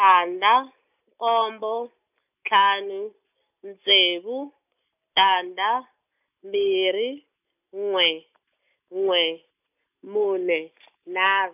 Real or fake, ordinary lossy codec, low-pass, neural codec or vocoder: real; none; 3.6 kHz; none